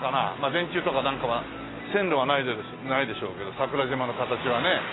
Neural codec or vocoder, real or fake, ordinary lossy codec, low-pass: none; real; AAC, 16 kbps; 7.2 kHz